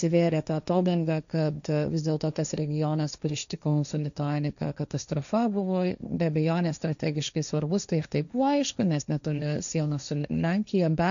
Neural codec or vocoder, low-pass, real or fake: codec, 16 kHz, 1.1 kbps, Voila-Tokenizer; 7.2 kHz; fake